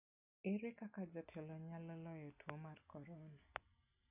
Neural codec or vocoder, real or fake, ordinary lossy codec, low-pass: none; real; none; 3.6 kHz